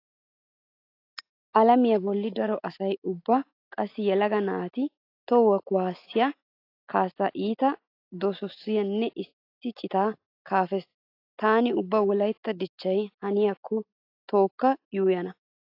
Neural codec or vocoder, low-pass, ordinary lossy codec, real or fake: none; 5.4 kHz; AAC, 32 kbps; real